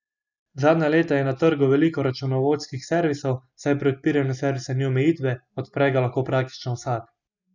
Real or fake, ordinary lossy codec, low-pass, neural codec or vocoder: real; none; 7.2 kHz; none